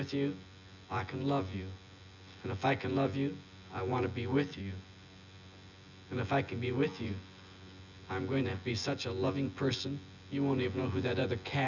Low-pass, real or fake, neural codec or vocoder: 7.2 kHz; fake; vocoder, 24 kHz, 100 mel bands, Vocos